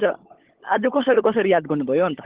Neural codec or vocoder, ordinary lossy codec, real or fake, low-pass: codec, 16 kHz, 16 kbps, FunCodec, trained on LibriTTS, 50 frames a second; Opus, 16 kbps; fake; 3.6 kHz